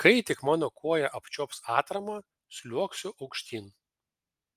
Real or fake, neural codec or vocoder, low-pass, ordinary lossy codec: real; none; 14.4 kHz; Opus, 32 kbps